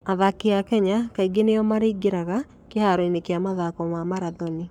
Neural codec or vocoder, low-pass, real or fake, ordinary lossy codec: codec, 44.1 kHz, 7.8 kbps, Pupu-Codec; 19.8 kHz; fake; none